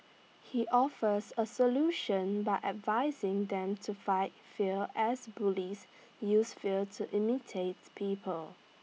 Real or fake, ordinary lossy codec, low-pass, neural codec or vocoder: real; none; none; none